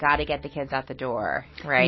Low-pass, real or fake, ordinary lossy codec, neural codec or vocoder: 7.2 kHz; real; MP3, 24 kbps; none